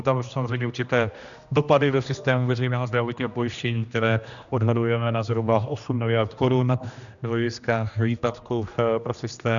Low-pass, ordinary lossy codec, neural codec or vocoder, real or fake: 7.2 kHz; MP3, 96 kbps; codec, 16 kHz, 1 kbps, X-Codec, HuBERT features, trained on general audio; fake